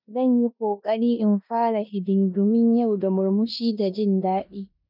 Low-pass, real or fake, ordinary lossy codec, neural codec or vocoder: 5.4 kHz; fake; none; codec, 16 kHz in and 24 kHz out, 0.9 kbps, LongCat-Audio-Codec, four codebook decoder